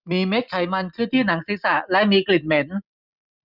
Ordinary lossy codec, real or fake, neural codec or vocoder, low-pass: none; real; none; 5.4 kHz